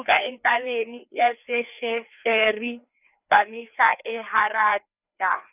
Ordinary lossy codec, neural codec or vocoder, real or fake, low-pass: none; codec, 16 kHz, 2 kbps, FreqCodec, larger model; fake; 3.6 kHz